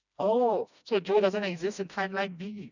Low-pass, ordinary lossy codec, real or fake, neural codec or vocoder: 7.2 kHz; none; fake; codec, 16 kHz, 1 kbps, FreqCodec, smaller model